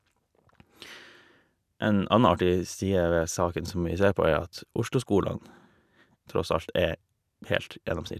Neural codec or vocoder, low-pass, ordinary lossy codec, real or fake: none; 14.4 kHz; none; real